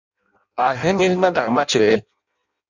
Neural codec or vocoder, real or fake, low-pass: codec, 16 kHz in and 24 kHz out, 0.6 kbps, FireRedTTS-2 codec; fake; 7.2 kHz